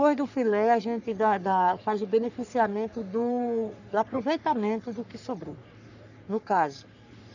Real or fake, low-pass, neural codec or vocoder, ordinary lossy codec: fake; 7.2 kHz; codec, 44.1 kHz, 3.4 kbps, Pupu-Codec; none